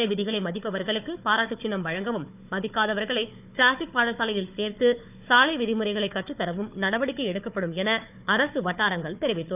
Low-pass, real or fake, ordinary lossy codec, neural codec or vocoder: 3.6 kHz; fake; none; codec, 16 kHz, 4 kbps, FunCodec, trained on Chinese and English, 50 frames a second